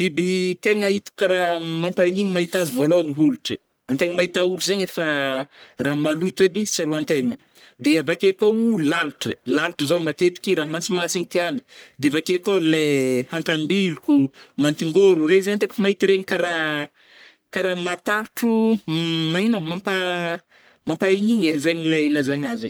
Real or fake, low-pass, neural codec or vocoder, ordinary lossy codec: fake; none; codec, 44.1 kHz, 1.7 kbps, Pupu-Codec; none